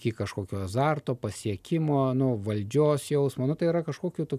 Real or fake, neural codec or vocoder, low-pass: real; none; 14.4 kHz